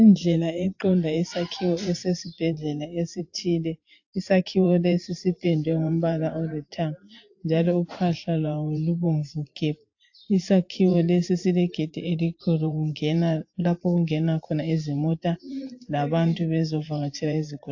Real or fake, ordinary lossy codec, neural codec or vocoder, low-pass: fake; AAC, 48 kbps; vocoder, 44.1 kHz, 128 mel bands every 512 samples, BigVGAN v2; 7.2 kHz